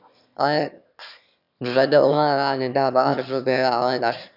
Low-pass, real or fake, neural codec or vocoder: 5.4 kHz; fake; autoencoder, 22.05 kHz, a latent of 192 numbers a frame, VITS, trained on one speaker